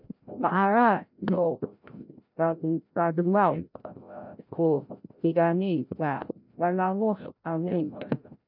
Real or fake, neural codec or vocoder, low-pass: fake; codec, 16 kHz, 0.5 kbps, FreqCodec, larger model; 5.4 kHz